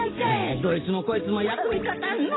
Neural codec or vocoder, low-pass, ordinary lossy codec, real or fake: vocoder, 44.1 kHz, 128 mel bands every 512 samples, BigVGAN v2; 7.2 kHz; AAC, 16 kbps; fake